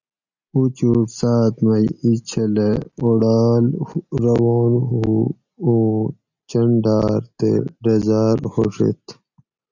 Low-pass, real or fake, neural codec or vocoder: 7.2 kHz; real; none